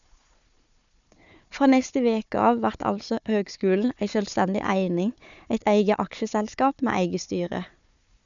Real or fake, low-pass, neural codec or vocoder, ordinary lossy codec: fake; 7.2 kHz; codec, 16 kHz, 4 kbps, FunCodec, trained on Chinese and English, 50 frames a second; none